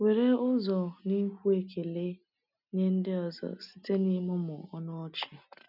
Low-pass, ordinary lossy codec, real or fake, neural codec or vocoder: 5.4 kHz; none; real; none